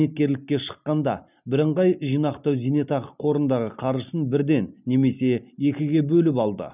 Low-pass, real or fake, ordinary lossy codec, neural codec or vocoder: 3.6 kHz; real; none; none